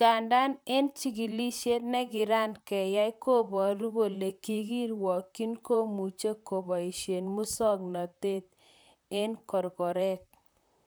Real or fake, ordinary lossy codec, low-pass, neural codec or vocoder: fake; none; none; vocoder, 44.1 kHz, 128 mel bands, Pupu-Vocoder